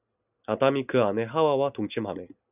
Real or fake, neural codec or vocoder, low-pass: real; none; 3.6 kHz